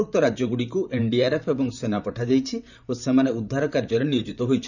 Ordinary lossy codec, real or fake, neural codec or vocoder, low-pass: none; fake; vocoder, 44.1 kHz, 128 mel bands, Pupu-Vocoder; 7.2 kHz